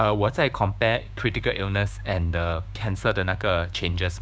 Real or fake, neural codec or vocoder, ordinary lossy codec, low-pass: fake; codec, 16 kHz, 6 kbps, DAC; none; none